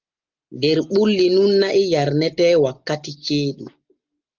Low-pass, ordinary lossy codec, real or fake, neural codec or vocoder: 7.2 kHz; Opus, 32 kbps; real; none